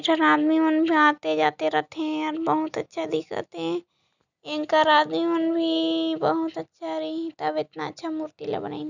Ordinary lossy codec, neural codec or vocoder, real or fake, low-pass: none; none; real; 7.2 kHz